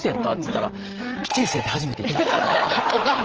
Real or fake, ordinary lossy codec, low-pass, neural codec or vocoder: fake; Opus, 16 kbps; 7.2 kHz; codec, 16 kHz, 8 kbps, FreqCodec, larger model